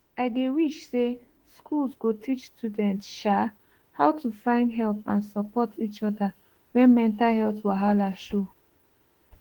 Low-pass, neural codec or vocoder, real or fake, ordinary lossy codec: 19.8 kHz; autoencoder, 48 kHz, 32 numbers a frame, DAC-VAE, trained on Japanese speech; fake; Opus, 16 kbps